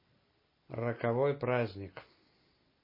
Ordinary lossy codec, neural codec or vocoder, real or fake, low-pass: MP3, 24 kbps; none; real; 5.4 kHz